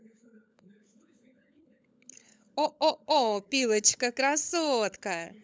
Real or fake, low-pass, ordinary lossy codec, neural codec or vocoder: fake; none; none; codec, 16 kHz, 16 kbps, FunCodec, trained on LibriTTS, 50 frames a second